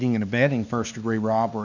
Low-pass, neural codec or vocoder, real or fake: 7.2 kHz; codec, 16 kHz, 4 kbps, X-Codec, HuBERT features, trained on LibriSpeech; fake